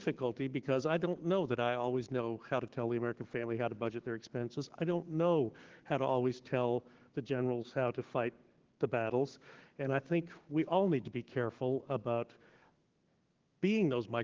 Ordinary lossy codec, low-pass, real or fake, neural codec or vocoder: Opus, 16 kbps; 7.2 kHz; fake; codec, 16 kHz, 6 kbps, DAC